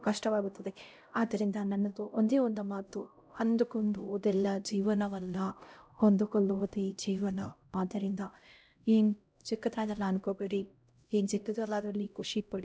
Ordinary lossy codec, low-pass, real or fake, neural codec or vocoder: none; none; fake; codec, 16 kHz, 0.5 kbps, X-Codec, HuBERT features, trained on LibriSpeech